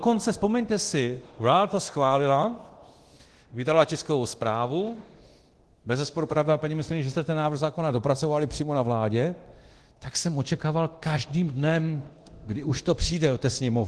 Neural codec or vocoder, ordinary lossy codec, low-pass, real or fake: codec, 24 kHz, 0.9 kbps, DualCodec; Opus, 16 kbps; 10.8 kHz; fake